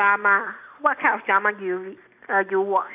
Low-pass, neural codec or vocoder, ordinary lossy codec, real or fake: 3.6 kHz; none; AAC, 32 kbps; real